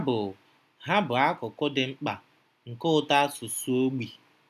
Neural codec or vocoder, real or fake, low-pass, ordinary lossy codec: none; real; 14.4 kHz; none